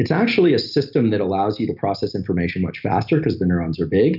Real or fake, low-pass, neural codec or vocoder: real; 5.4 kHz; none